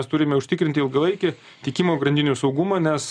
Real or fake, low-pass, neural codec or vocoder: real; 9.9 kHz; none